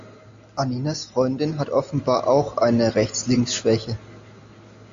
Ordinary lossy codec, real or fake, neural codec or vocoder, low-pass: AAC, 64 kbps; real; none; 7.2 kHz